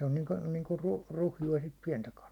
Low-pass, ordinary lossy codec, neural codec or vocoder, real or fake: 19.8 kHz; none; none; real